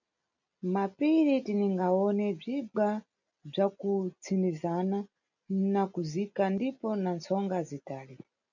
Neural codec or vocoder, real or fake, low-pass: none; real; 7.2 kHz